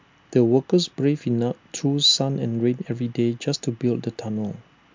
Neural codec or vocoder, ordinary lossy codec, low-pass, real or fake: none; MP3, 64 kbps; 7.2 kHz; real